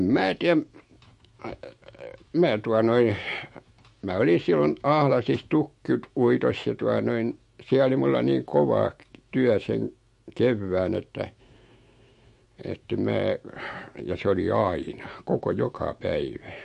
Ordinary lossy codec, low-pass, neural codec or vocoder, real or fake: MP3, 48 kbps; 14.4 kHz; autoencoder, 48 kHz, 128 numbers a frame, DAC-VAE, trained on Japanese speech; fake